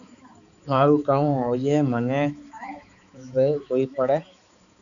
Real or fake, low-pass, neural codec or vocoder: fake; 7.2 kHz; codec, 16 kHz, 4 kbps, X-Codec, HuBERT features, trained on balanced general audio